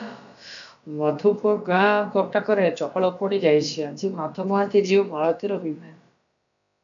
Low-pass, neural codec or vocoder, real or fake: 7.2 kHz; codec, 16 kHz, about 1 kbps, DyCAST, with the encoder's durations; fake